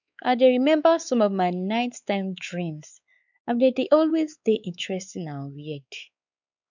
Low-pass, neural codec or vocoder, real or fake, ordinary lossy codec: 7.2 kHz; codec, 16 kHz, 4 kbps, X-Codec, WavLM features, trained on Multilingual LibriSpeech; fake; none